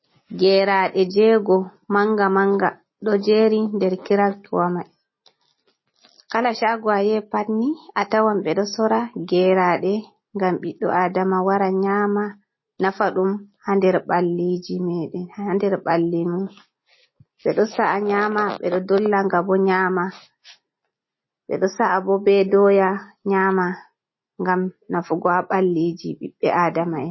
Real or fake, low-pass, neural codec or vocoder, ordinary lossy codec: real; 7.2 kHz; none; MP3, 24 kbps